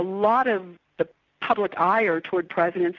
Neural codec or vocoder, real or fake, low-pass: none; real; 7.2 kHz